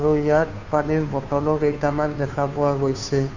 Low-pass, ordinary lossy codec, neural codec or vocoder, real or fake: 7.2 kHz; none; codec, 16 kHz, 2 kbps, FunCodec, trained on Chinese and English, 25 frames a second; fake